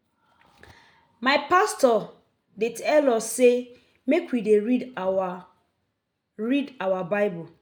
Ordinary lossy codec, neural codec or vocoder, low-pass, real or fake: none; none; none; real